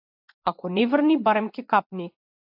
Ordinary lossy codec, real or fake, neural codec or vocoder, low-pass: AAC, 48 kbps; fake; vocoder, 24 kHz, 100 mel bands, Vocos; 5.4 kHz